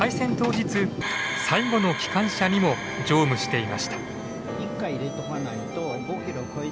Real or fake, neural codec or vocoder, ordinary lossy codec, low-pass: real; none; none; none